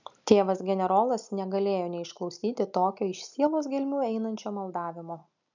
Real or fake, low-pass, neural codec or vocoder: real; 7.2 kHz; none